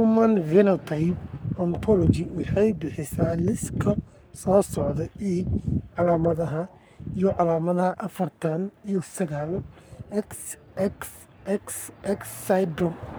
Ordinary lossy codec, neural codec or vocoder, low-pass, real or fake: none; codec, 44.1 kHz, 3.4 kbps, Pupu-Codec; none; fake